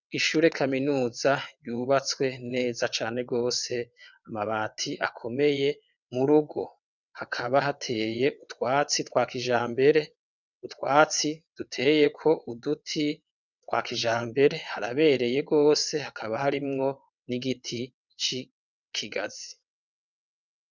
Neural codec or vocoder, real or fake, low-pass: vocoder, 22.05 kHz, 80 mel bands, WaveNeXt; fake; 7.2 kHz